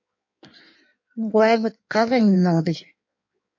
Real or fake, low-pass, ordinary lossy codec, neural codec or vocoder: fake; 7.2 kHz; MP3, 48 kbps; codec, 16 kHz in and 24 kHz out, 1.1 kbps, FireRedTTS-2 codec